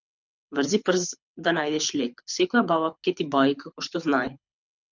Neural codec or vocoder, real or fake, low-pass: codec, 24 kHz, 6 kbps, HILCodec; fake; 7.2 kHz